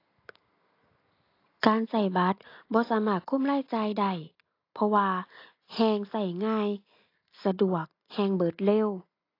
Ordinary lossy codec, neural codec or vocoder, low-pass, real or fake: AAC, 32 kbps; none; 5.4 kHz; real